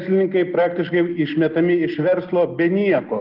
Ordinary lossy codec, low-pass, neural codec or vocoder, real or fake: Opus, 32 kbps; 5.4 kHz; none; real